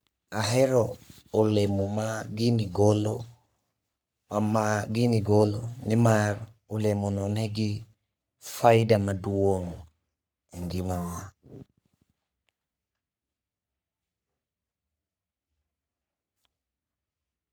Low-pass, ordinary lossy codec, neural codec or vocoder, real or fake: none; none; codec, 44.1 kHz, 3.4 kbps, Pupu-Codec; fake